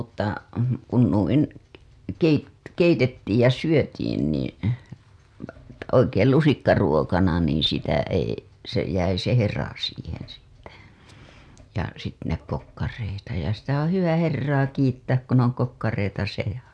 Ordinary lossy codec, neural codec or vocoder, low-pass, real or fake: none; none; none; real